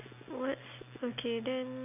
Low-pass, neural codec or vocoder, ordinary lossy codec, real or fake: 3.6 kHz; none; none; real